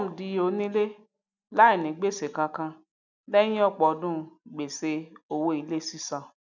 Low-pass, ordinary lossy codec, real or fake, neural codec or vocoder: 7.2 kHz; none; real; none